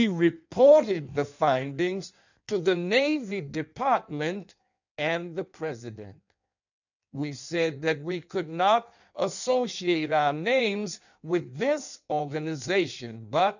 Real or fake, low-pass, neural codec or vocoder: fake; 7.2 kHz; codec, 16 kHz in and 24 kHz out, 1.1 kbps, FireRedTTS-2 codec